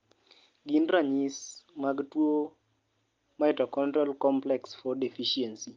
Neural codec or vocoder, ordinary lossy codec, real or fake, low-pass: none; Opus, 32 kbps; real; 7.2 kHz